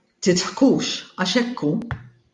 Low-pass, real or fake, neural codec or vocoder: 10.8 kHz; fake; vocoder, 44.1 kHz, 128 mel bands every 512 samples, BigVGAN v2